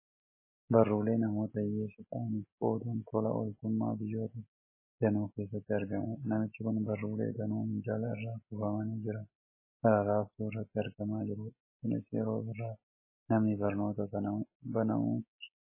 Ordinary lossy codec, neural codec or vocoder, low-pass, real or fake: MP3, 16 kbps; none; 3.6 kHz; real